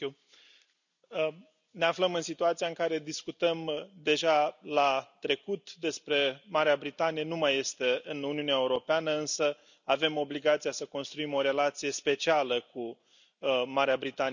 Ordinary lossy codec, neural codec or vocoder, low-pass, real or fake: none; none; 7.2 kHz; real